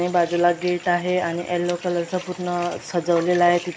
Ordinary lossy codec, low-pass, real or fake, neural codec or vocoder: none; none; real; none